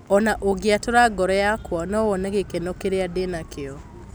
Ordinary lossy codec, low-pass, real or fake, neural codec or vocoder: none; none; real; none